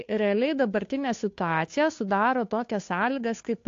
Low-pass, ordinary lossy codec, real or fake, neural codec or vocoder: 7.2 kHz; AAC, 48 kbps; fake; codec, 16 kHz, 2 kbps, FunCodec, trained on Chinese and English, 25 frames a second